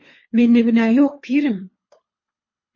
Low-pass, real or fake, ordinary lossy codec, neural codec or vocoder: 7.2 kHz; fake; MP3, 32 kbps; codec, 24 kHz, 3 kbps, HILCodec